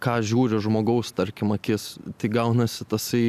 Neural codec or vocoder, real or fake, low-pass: none; real; 14.4 kHz